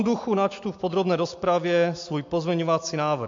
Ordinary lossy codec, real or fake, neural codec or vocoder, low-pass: MP3, 48 kbps; real; none; 7.2 kHz